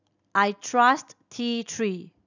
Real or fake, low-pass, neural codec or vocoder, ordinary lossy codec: real; 7.2 kHz; none; none